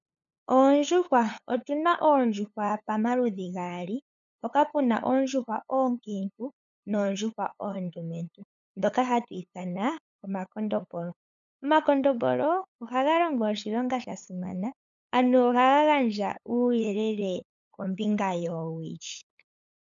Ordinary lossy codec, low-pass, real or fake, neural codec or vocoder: MP3, 96 kbps; 7.2 kHz; fake; codec, 16 kHz, 8 kbps, FunCodec, trained on LibriTTS, 25 frames a second